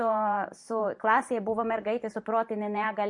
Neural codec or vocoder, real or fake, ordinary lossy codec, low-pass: vocoder, 44.1 kHz, 128 mel bands every 512 samples, BigVGAN v2; fake; MP3, 48 kbps; 10.8 kHz